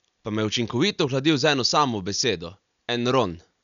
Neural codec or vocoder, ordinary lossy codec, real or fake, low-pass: none; none; real; 7.2 kHz